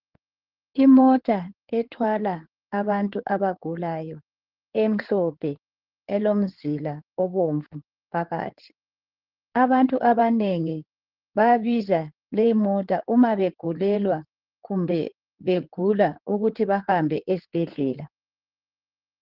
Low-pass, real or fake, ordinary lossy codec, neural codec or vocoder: 5.4 kHz; fake; Opus, 16 kbps; codec, 16 kHz in and 24 kHz out, 2.2 kbps, FireRedTTS-2 codec